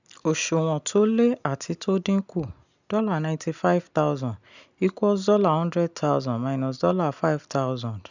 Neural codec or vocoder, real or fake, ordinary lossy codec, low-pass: none; real; none; 7.2 kHz